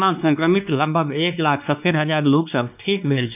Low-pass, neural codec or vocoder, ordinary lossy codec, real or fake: 3.6 kHz; codec, 16 kHz, 2 kbps, X-Codec, WavLM features, trained on Multilingual LibriSpeech; none; fake